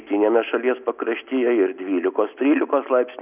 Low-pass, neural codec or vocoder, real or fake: 3.6 kHz; none; real